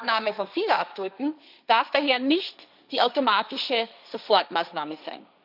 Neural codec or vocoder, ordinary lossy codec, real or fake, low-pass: codec, 16 kHz, 1.1 kbps, Voila-Tokenizer; none; fake; 5.4 kHz